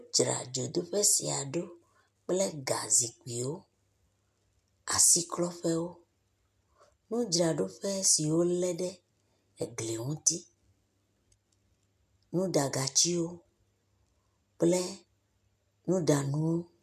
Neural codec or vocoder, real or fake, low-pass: none; real; 14.4 kHz